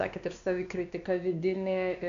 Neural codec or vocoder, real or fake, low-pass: codec, 16 kHz, 2 kbps, X-Codec, WavLM features, trained on Multilingual LibriSpeech; fake; 7.2 kHz